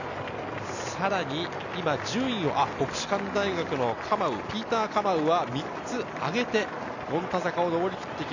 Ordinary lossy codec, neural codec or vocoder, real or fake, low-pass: none; none; real; 7.2 kHz